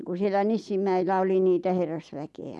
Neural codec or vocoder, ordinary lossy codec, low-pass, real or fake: none; none; none; real